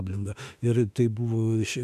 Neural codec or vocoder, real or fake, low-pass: autoencoder, 48 kHz, 32 numbers a frame, DAC-VAE, trained on Japanese speech; fake; 14.4 kHz